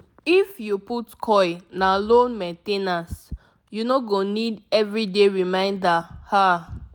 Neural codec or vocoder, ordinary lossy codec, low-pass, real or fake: none; none; none; real